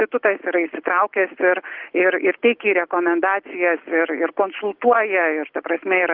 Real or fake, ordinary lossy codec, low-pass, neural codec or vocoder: real; Opus, 32 kbps; 5.4 kHz; none